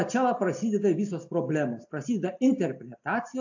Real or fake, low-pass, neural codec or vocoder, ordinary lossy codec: real; 7.2 kHz; none; AAC, 48 kbps